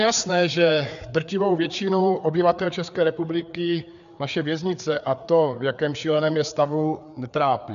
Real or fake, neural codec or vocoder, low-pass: fake; codec, 16 kHz, 4 kbps, FreqCodec, larger model; 7.2 kHz